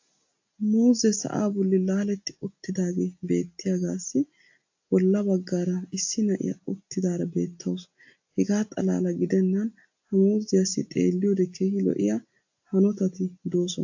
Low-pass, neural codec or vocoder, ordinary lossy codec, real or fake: 7.2 kHz; none; AAC, 48 kbps; real